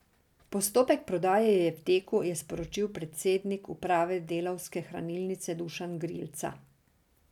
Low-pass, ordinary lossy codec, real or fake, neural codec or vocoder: 19.8 kHz; none; real; none